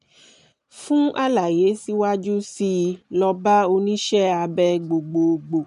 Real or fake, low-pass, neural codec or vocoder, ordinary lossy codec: real; 10.8 kHz; none; none